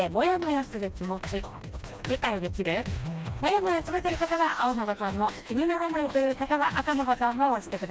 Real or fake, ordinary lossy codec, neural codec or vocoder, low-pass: fake; none; codec, 16 kHz, 1 kbps, FreqCodec, smaller model; none